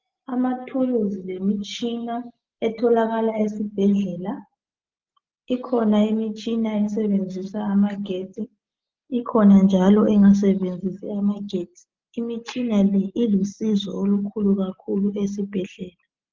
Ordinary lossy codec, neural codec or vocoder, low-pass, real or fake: Opus, 32 kbps; none; 7.2 kHz; real